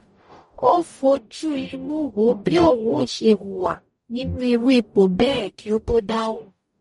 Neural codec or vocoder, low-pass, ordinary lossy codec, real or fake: codec, 44.1 kHz, 0.9 kbps, DAC; 19.8 kHz; MP3, 48 kbps; fake